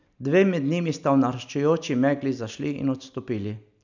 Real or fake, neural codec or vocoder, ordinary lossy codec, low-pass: real; none; none; 7.2 kHz